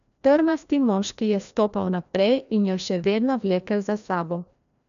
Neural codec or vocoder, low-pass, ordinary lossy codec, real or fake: codec, 16 kHz, 1 kbps, FreqCodec, larger model; 7.2 kHz; none; fake